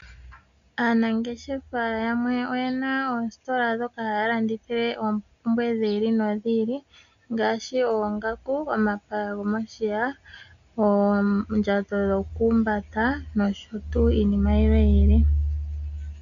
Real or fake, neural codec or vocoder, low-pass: real; none; 7.2 kHz